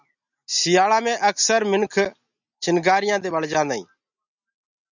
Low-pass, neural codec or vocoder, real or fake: 7.2 kHz; none; real